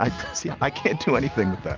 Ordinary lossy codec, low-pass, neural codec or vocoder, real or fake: Opus, 32 kbps; 7.2 kHz; none; real